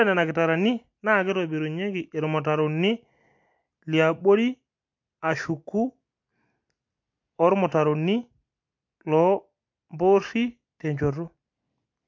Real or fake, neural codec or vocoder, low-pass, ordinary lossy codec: real; none; 7.2 kHz; MP3, 48 kbps